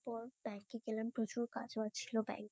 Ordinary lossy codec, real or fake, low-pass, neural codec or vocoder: none; fake; none; codec, 16 kHz, 6 kbps, DAC